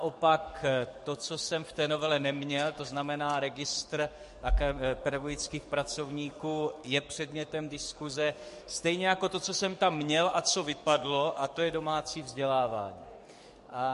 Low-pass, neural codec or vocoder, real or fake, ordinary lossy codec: 14.4 kHz; codec, 44.1 kHz, 7.8 kbps, DAC; fake; MP3, 48 kbps